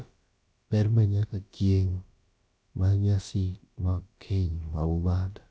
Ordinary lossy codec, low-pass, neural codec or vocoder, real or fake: none; none; codec, 16 kHz, about 1 kbps, DyCAST, with the encoder's durations; fake